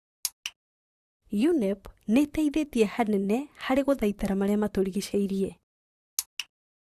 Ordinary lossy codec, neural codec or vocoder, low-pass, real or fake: Opus, 64 kbps; vocoder, 44.1 kHz, 128 mel bands, Pupu-Vocoder; 14.4 kHz; fake